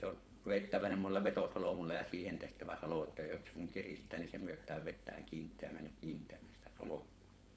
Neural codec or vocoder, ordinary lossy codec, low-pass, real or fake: codec, 16 kHz, 4.8 kbps, FACodec; none; none; fake